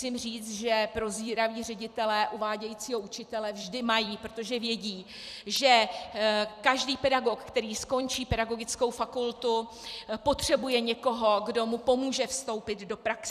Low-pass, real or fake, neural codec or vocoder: 14.4 kHz; fake; vocoder, 44.1 kHz, 128 mel bands every 256 samples, BigVGAN v2